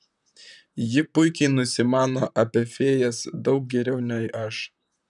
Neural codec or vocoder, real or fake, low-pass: vocoder, 44.1 kHz, 128 mel bands, Pupu-Vocoder; fake; 10.8 kHz